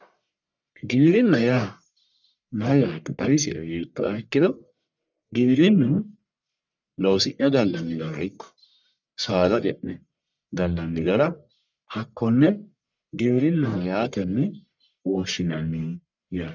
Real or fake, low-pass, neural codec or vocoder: fake; 7.2 kHz; codec, 44.1 kHz, 1.7 kbps, Pupu-Codec